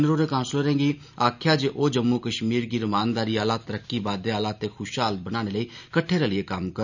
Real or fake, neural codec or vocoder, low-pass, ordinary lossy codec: real; none; 7.2 kHz; none